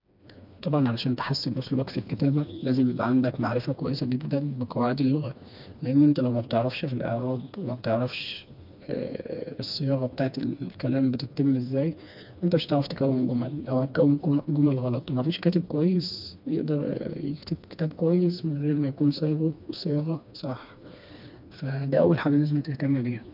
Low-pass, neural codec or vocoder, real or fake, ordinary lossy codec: 5.4 kHz; codec, 16 kHz, 2 kbps, FreqCodec, smaller model; fake; MP3, 48 kbps